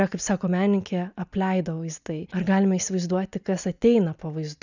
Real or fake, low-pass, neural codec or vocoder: real; 7.2 kHz; none